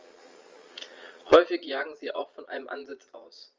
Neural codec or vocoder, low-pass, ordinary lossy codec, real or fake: vocoder, 22.05 kHz, 80 mel bands, Vocos; 7.2 kHz; Opus, 32 kbps; fake